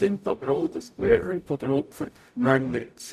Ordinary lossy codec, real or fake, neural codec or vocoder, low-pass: none; fake; codec, 44.1 kHz, 0.9 kbps, DAC; 14.4 kHz